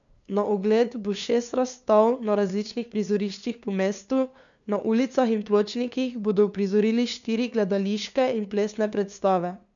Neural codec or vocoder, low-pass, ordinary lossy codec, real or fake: codec, 16 kHz, 2 kbps, FunCodec, trained on LibriTTS, 25 frames a second; 7.2 kHz; none; fake